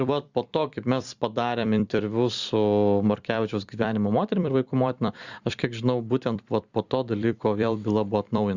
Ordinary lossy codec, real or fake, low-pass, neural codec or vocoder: Opus, 64 kbps; fake; 7.2 kHz; vocoder, 44.1 kHz, 128 mel bands every 256 samples, BigVGAN v2